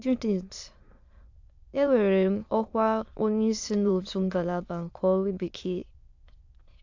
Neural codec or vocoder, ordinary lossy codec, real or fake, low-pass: autoencoder, 22.05 kHz, a latent of 192 numbers a frame, VITS, trained on many speakers; AAC, 48 kbps; fake; 7.2 kHz